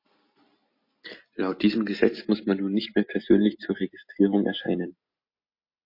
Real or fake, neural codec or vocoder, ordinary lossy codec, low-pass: real; none; MP3, 32 kbps; 5.4 kHz